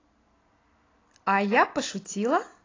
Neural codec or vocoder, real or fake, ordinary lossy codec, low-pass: none; real; AAC, 32 kbps; 7.2 kHz